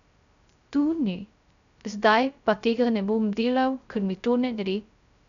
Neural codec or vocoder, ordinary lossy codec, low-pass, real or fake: codec, 16 kHz, 0.3 kbps, FocalCodec; none; 7.2 kHz; fake